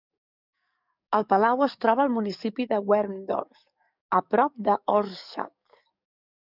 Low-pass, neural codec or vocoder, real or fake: 5.4 kHz; codec, 44.1 kHz, 7.8 kbps, DAC; fake